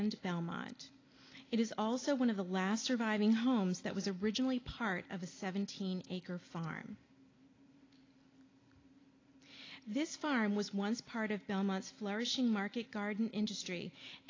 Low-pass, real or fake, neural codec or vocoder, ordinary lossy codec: 7.2 kHz; real; none; AAC, 32 kbps